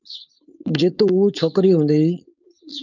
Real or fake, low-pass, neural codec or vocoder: fake; 7.2 kHz; codec, 16 kHz, 4.8 kbps, FACodec